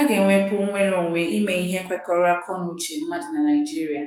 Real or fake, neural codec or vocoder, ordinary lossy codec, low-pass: fake; codec, 44.1 kHz, 7.8 kbps, DAC; none; 19.8 kHz